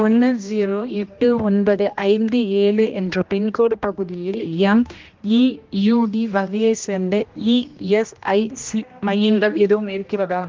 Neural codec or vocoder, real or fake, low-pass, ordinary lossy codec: codec, 16 kHz, 1 kbps, X-Codec, HuBERT features, trained on general audio; fake; 7.2 kHz; Opus, 32 kbps